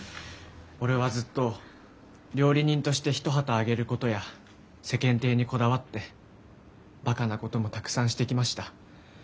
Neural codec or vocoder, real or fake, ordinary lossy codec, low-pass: none; real; none; none